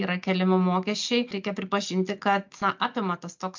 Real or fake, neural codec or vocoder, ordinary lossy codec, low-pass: real; none; MP3, 64 kbps; 7.2 kHz